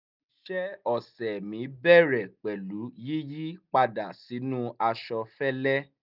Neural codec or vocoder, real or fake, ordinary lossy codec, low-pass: none; real; none; 5.4 kHz